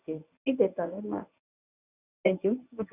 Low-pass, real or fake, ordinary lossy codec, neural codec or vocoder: 3.6 kHz; fake; none; codec, 24 kHz, 0.9 kbps, WavTokenizer, medium speech release version 1